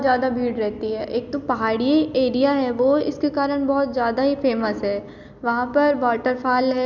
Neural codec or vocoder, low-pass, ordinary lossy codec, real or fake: none; 7.2 kHz; none; real